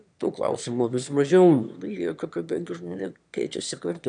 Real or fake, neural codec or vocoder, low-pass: fake; autoencoder, 22.05 kHz, a latent of 192 numbers a frame, VITS, trained on one speaker; 9.9 kHz